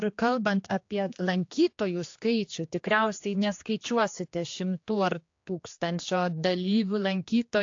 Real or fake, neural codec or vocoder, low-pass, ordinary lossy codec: fake; codec, 16 kHz, 2 kbps, X-Codec, HuBERT features, trained on general audio; 7.2 kHz; AAC, 48 kbps